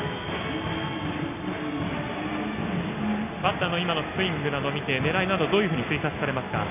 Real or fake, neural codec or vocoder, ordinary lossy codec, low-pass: real; none; AAC, 32 kbps; 3.6 kHz